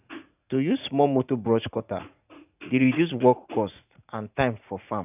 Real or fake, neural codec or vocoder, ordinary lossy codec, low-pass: fake; vocoder, 44.1 kHz, 80 mel bands, Vocos; none; 3.6 kHz